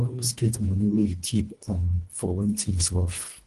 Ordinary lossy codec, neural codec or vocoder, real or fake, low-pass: Opus, 24 kbps; codec, 24 kHz, 1.5 kbps, HILCodec; fake; 10.8 kHz